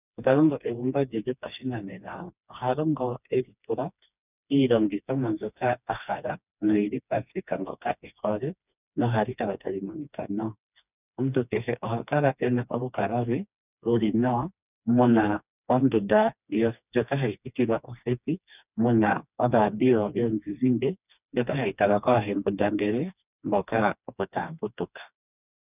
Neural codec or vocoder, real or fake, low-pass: codec, 16 kHz, 2 kbps, FreqCodec, smaller model; fake; 3.6 kHz